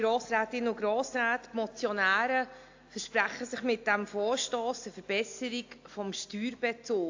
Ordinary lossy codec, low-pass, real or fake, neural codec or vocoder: AAC, 48 kbps; 7.2 kHz; real; none